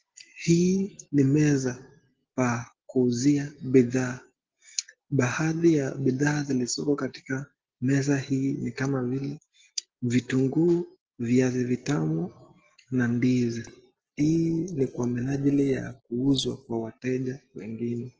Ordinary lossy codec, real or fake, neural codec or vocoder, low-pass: Opus, 16 kbps; real; none; 7.2 kHz